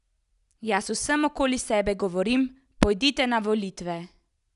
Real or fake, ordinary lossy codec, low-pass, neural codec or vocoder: real; none; 10.8 kHz; none